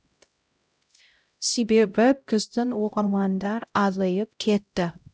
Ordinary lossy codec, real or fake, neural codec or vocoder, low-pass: none; fake; codec, 16 kHz, 0.5 kbps, X-Codec, HuBERT features, trained on LibriSpeech; none